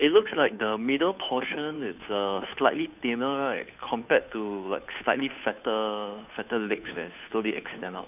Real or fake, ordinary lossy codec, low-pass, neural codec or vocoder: fake; none; 3.6 kHz; codec, 16 kHz, 2 kbps, FunCodec, trained on Chinese and English, 25 frames a second